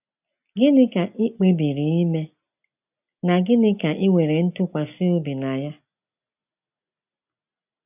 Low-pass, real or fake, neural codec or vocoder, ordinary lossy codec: 3.6 kHz; real; none; none